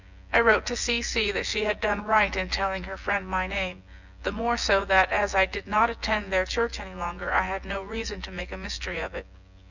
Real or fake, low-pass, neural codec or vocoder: fake; 7.2 kHz; vocoder, 24 kHz, 100 mel bands, Vocos